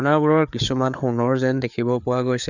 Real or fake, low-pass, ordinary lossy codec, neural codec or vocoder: fake; 7.2 kHz; none; codec, 16 kHz, 4 kbps, FreqCodec, larger model